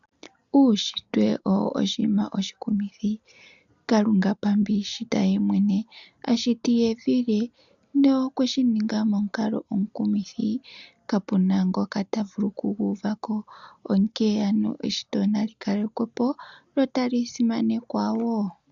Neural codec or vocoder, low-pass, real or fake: none; 7.2 kHz; real